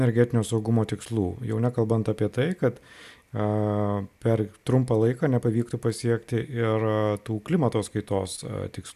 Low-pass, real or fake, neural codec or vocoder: 14.4 kHz; real; none